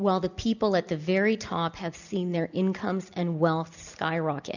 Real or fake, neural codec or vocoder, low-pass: real; none; 7.2 kHz